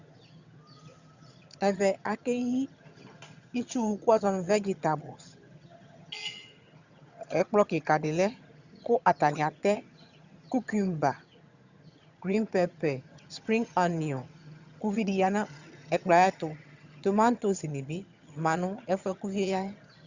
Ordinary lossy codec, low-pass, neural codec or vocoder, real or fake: Opus, 64 kbps; 7.2 kHz; vocoder, 22.05 kHz, 80 mel bands, HiFi-GAN; fake